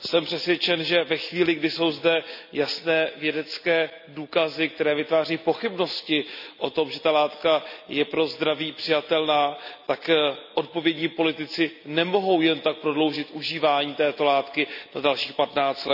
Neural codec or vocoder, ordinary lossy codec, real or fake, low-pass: none; none; real; 5.4 kHz